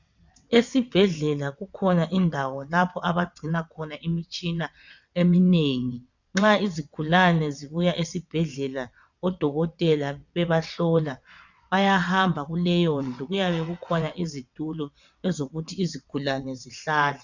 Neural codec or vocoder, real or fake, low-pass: vocoder, 22.05 kHz, 80 mel bands, WaveNeXt; fake; 7.2 kHz